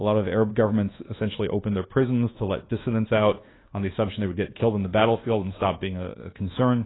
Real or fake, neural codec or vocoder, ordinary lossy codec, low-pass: fake; codec, 24 kHz, 1.2 kbps, DualCodec; AAC, 16 kbps; 7.2 kHz